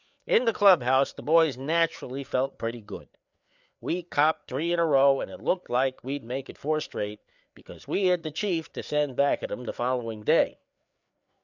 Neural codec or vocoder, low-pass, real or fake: codec, 16 kHz, 4 kbps, FreqCodec, larger model; 7.2 kHz; fake